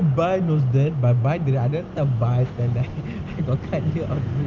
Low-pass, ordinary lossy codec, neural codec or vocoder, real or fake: 7.2 kHz; Opus, 32 kbps; none; real